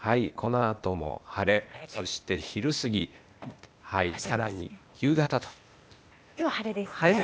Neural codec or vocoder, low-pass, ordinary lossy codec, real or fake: codec, 16 kHz, 0.8 kbps, ZipCodec; none; none; fake